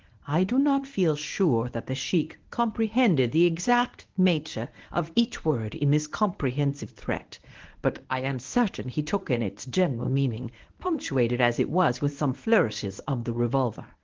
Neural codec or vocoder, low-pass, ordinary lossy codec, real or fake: codec, 24 kHz, 0.9 kbps, WavTokenizer, medium speech release version 1; 7.2 kHz; Opus, 16 kbps; fake